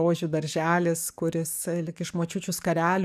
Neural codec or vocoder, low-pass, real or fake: codec, 44.1 kHz, 7.8 kbps, DAC; 14.4 kHz; fake